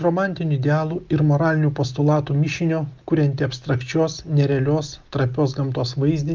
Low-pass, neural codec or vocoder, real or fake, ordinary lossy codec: 7.2 kHz; none; real; Opus, 32 kbps